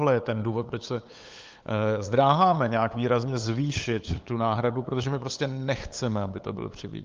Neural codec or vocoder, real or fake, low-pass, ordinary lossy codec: codec, 16 kHz, 8 kbps, FunCodec, trained on LibriTTS, 25 frames a second; fake; 7.2 kHz; Opus, 24 kbps